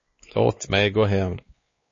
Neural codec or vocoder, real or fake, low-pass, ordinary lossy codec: codec, 16 kHz, 2 kbps, X-Codec, WavLM features, trained on Multilingual LibriSpeech; fake; 7.2 kHz; MP3, 32 kbps